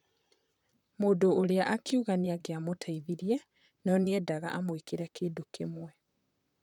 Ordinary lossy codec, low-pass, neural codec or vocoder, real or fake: none; 19.8 kHz; vocoder, 44.1 kHz, 128 mel bands, Pupu-Vocoder; fake